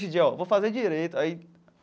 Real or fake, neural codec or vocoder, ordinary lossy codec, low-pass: real; none; none; none